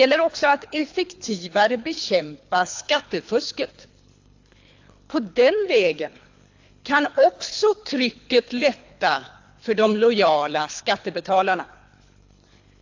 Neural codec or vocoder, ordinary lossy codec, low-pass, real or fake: codec, 24 kHz, 3 kbps, HILCodec; AAC, 48 kbps; 7.2 kHz; fake